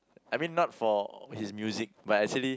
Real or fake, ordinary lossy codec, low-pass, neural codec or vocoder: real; none; none; none